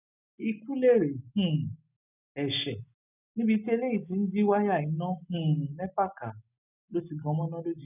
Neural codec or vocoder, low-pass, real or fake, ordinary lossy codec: none; 3.6 kHz; real; none